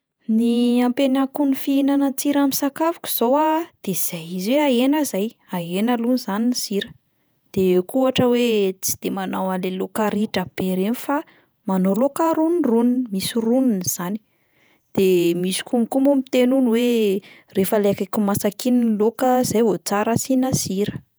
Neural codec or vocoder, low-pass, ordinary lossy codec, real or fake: vocoder, 48 kHz, 128 mel bands, Vocos; none; none; fake